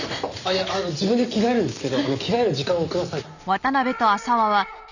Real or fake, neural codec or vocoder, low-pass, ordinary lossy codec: real; none; 7.2 kHz; none